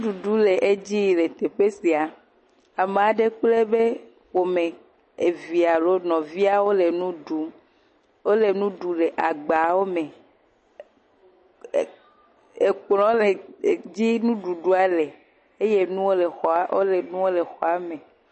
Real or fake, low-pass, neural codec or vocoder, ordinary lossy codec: real; 10.8 kHz; none; MP3, 32 kbps